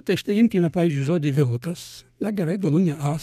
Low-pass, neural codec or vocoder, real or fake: 14.4 kHz; codec, 32 kHz, 1.9 kbps, SNAC; fake